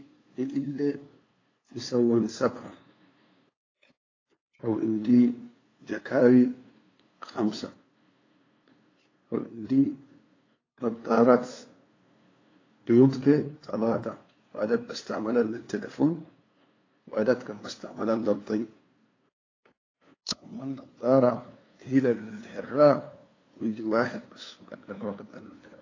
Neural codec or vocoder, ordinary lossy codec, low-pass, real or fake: codec, 16 kHz, 2 kbps, FunCodec, trained on LibriTTS, 25 frames a second; AAC, 32 kbps; 7.2 kHz; fake